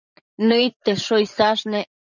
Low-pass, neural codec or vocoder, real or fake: 7.2 kHz; vocoder, 44.1 kHz, 80 mel bands, Vocos; fake